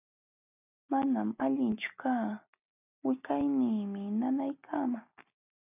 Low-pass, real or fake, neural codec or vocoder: 3.6 kHz; real; none